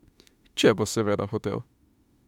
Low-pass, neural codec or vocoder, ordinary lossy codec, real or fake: 19.8 kHz; autoencoder, 48 kHz, 32 numbers a frame, DAC-VAE, trained on Japanese speech; MP3, 96 kbps; fake